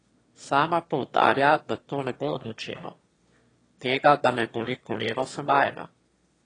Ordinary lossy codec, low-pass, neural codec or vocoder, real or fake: AAC, 32 kbps; 9.9 kHz; autoencoder, 22.05 kHz, a latent of 192 numbers a frame, VITS, trained on one speaker; fake